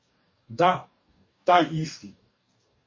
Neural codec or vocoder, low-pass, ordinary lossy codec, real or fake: codec, 44.1 kHz, 2.6 kbps, DAC; 7.2 kHz; MP3, 32 kbps; fake